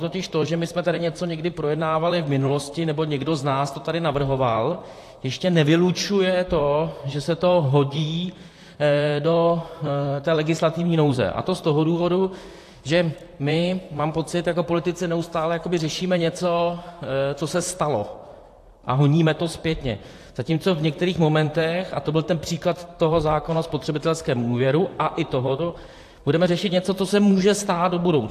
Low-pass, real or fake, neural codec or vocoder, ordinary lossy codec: 14.4 kHz; fake; vocoder, 44.1 kHz, 128 mel bands, Pupu-Vocoder; AAC, 64 kbps